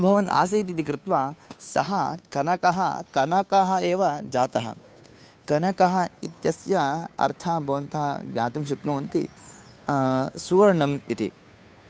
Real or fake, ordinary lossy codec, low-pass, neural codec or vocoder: fake; none; none; codec, 16 kHz, 2 kbps, FunCodec, trained on Chinese and English, 25 frames a second